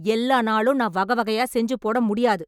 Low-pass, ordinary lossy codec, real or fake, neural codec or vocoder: 19.8 kHz; none; real; none